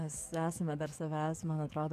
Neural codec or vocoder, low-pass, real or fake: codec, 44.1 kHz, 7.8 kbps, DAC; 14.4 kHz; fake